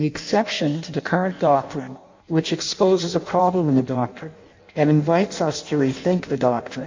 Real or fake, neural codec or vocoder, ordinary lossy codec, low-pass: fake; codec, 16 kHz in and 24 kHz out, 0.6 kbps, FireRedTTS-2 codec; MP3, 48 kbps; 7.2 kHz